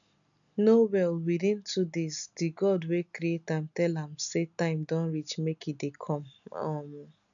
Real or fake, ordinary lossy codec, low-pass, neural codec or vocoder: real; none; 7.2 kHz; none